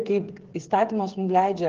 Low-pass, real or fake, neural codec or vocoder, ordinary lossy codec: 7.2 kHz; fake; codec, 16 kHz, 8 kbps, FreqCodec, smaller model; Opus, 16 kbps